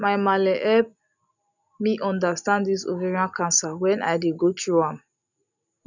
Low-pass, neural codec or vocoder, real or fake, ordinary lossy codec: 7.2 kHz; none; real; none